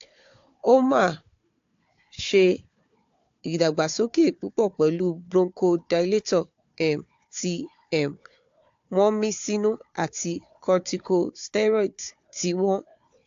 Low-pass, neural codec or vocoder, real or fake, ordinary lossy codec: 7.2 kHz; codec, 16 kHz, 4 kbps, FunCodec, trained on Chinese and English, 50 frames a second; fake; AAC, 48 kbps